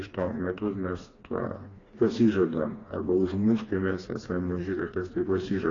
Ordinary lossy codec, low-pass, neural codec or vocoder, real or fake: AAC, 32 kbps; 7.2 kHz; codec, 16 kHz, 2 kbps, FreqCodec, smaller model; fake